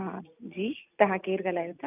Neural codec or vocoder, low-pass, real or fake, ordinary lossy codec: none; 3.6 kHz; real; none